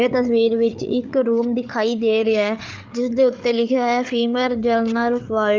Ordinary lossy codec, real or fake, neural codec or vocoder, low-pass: Opus, 32 kbps; fake; codec, 16 kHz, 8 kbps, FreqCodec, larger model; 7.2 kHz